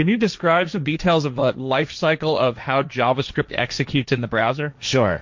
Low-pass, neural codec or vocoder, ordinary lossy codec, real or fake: 7.2 kHz; codec, 16 kHz, 1.1 kbps, Voila-Tokenizer; MP3, 48 kbps; fake